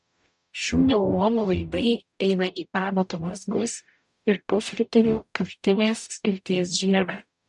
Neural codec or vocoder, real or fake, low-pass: codec, 44.1 kHz, 0.9 kbps, DAC; fake; 10.8 kHz